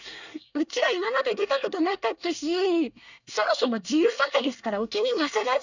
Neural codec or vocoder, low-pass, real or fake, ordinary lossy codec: codec, 24 kHz, 1 kbps, SNAC; 7.2 kHz; fake; none